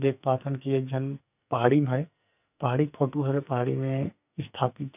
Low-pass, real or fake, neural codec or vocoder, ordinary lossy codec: 3.6 kHz; fake; codec, 44.1 kHz, 2.6 kbps, SNAC; none